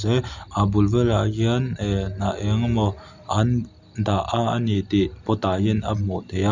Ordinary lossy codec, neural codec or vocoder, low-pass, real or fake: none; none; 7.2 kHz; real